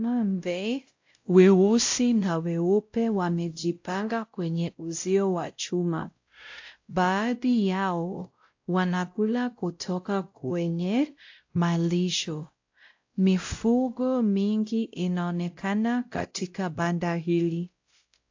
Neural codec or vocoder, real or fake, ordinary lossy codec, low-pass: codec, 16 kHz, 0.5 kbps, X-Codec, WavLM features, trained on Multilingual LibriSpeech; fake; AAC, 48 kbps; 7.2 kHz